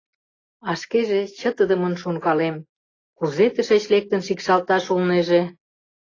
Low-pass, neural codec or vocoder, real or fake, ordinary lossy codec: 7.2 kHz; none; real; AAC, 32 kbps